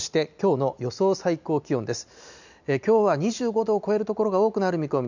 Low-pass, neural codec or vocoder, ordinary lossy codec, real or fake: 7.2 kHz; none; none; real